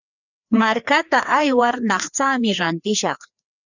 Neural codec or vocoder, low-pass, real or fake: codec, 16 kHz, 2 kbps, FreqCodec, larger model; 7.2 kHz; fake